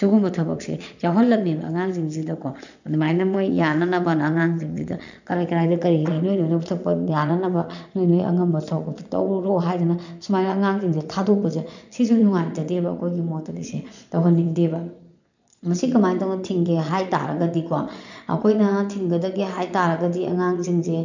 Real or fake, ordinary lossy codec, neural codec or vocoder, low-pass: fake; none; vocoder, 22.05 kHz, 80 mel bands, Vocos; 7.2 kHz